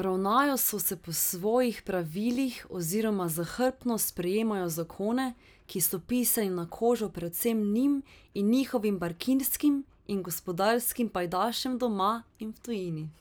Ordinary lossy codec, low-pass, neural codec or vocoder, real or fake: none; none; none; real